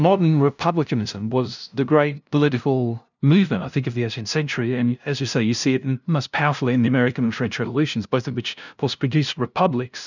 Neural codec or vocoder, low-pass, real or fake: codec, 16 kHz, 0.5 kbps, FunCodec, trained on LibriTTS, 25 frames a second; 7.2 kHz; fake